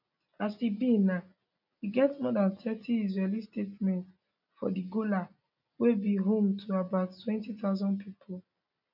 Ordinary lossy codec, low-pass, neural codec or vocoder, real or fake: none; 5.4 kHz; none; real